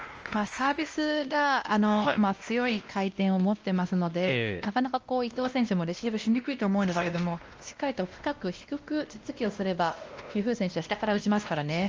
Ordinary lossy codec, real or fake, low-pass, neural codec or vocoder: Opus, 24 kbps; fake; 7.2 kHz; codec, 16 kHz, 1 kbps, X-Codec, WavLM features, trained on Multilingual LibriSpeech